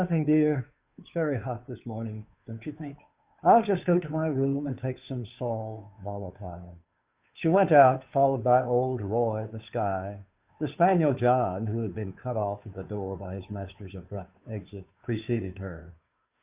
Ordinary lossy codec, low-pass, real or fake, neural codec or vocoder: Opus, 64 kbps; 3.6 kHz; fake; codec, 16 kHz, 2 kbps, FunCodec, trained on Chinese and English, 25 frames a second